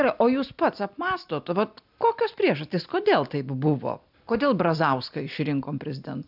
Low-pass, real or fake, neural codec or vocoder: 5.4 kHz; real; none